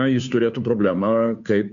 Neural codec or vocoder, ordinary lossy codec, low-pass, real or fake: codec, 16 kHz, 2 kbps, FunCodec, trained on Chinese and English, 25 frames a second; MP3, 48 kbps; 7.2 kHz; fake